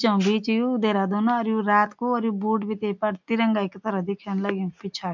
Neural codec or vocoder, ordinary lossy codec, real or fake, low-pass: none; MP3, 64 kbps; real; 7.2 kHz